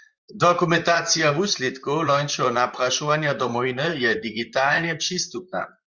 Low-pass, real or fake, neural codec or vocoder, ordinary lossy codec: 7.2 kHz; fake; vocoder, 44.1 kHz, 128 mel bands, Pupu-Vocoder; Opus, 64 kbps